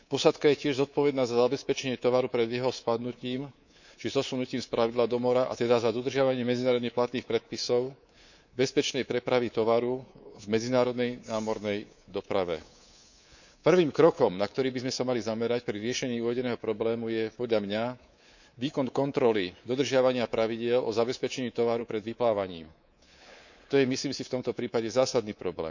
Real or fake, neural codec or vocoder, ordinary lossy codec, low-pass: fake; codec, 24 kHz, 3.1 kbps, DualCodec; none; 7.2 kHz